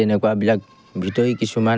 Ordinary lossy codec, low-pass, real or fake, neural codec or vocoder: none; none; real; none